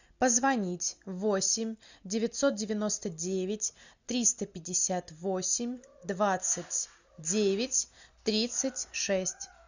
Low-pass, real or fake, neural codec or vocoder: 7.2 kHz; real; none